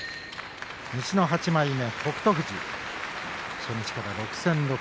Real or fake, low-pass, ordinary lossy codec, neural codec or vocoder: real; none; none; none